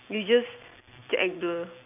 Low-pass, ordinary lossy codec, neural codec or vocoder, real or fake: 3.6 kHz; none; vocoder, 44.1 kHz, 128 mel bands every 256 samples, BigVGAN v2; fake